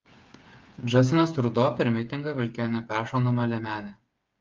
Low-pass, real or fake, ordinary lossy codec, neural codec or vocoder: 7.2 kHz; fake; Opus, 32 kbps; codec, 16 kHz, 8 kbps, FreqCodec, smaller model